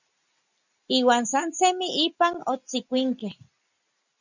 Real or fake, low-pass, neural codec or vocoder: real; 7.2 kHz; none